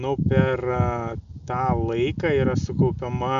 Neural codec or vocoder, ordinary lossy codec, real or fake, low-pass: none; AAC, 96 kbps; real; 7.2 kHz